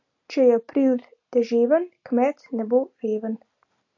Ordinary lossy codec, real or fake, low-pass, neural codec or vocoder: AAC, 48 kbps; real; 7.2 kHz; none